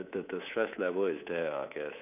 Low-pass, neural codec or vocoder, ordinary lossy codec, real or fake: 3.6 kHz; codec, 24 kHz, 3.1 kbps, DualCodec; none; fake